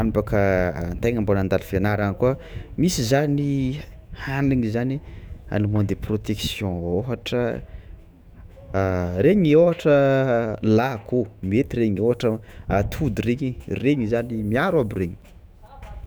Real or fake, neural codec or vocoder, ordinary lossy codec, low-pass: fake; autoencoder, 48 kHz, 128 numbers a frame, DAC-VAE, trained on Japanese speech; none; none